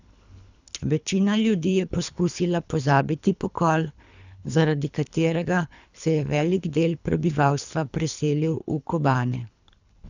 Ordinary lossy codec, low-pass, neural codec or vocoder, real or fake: none; 7.2 kHz; codec, 24 kHz, 3 kbps, HILCodec; fake